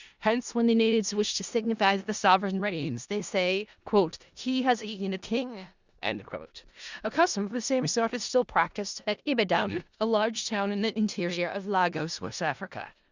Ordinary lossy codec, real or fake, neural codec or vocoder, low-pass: Opus, 64 kbps; fake; codec, 16 kHz in and 24 kHz out, 0.4 kbps, LongCat-Audio-Codec, four codebook decoder; 7.2 kHz